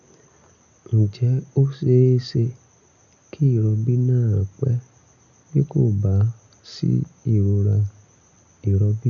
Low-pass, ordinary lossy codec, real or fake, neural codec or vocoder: 7.2 kHz; none; real; none